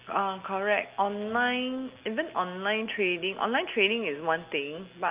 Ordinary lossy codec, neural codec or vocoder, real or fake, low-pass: Opus, 24 kbps; none; real; 3.6 kHz